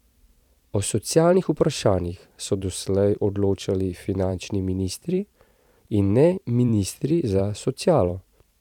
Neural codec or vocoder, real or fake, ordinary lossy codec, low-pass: vocoder, 44.1 kHz, 128 mel bands every 512 samples, BigVGAN v2; fake; none; 19.8 kHz